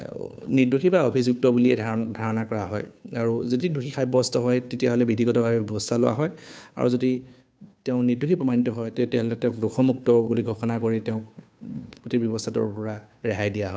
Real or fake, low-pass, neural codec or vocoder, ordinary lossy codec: fake; none; codec, 16 kHz, 2 kbps, FunCodec, trained on Chinese and English, 25 frames a second; none